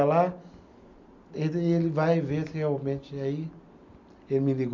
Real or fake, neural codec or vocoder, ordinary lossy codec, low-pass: real; none; none; 7.2 kHz